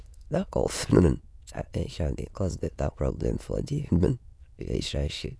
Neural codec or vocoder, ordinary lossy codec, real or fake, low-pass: autoencoder, 22.05 kHz, a latent of 192 numbers a frame, VITS, trained on many speakers; none; fake; none